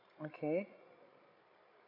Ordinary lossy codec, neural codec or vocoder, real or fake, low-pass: MP3, 48 kbps; codec, 16 kHz, 16 kbps, FreqCodec, larger model; fake; 5.4 kHz